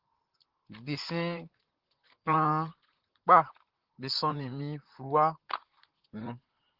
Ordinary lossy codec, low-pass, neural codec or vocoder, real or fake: Opus, 16 kbps; 5.4 kHz; vocoder, 44.1 kHz, 128 mel bands, Pupu-Vocoder; fake